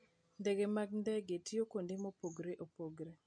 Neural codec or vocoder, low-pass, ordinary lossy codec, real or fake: none; 9.9 kHz; MP3, 48 kbps; real